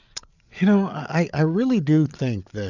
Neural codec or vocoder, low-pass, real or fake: codec, 16 kHz, 8 kbps, FreqCodec, larger model; 7.2 kHz; fake